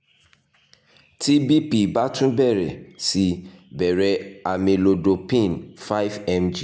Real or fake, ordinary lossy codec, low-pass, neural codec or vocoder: real; none; none; none